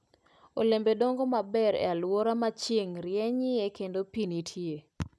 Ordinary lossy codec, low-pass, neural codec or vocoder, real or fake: none; none; none; real